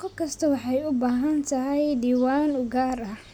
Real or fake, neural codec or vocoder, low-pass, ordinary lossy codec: real; none; 19.8 kHz; none